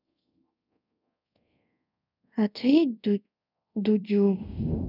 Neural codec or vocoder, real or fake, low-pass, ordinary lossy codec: codec, 24 kHz, 0.5 kbps, DualCodec; fake; 5.4 kHz; none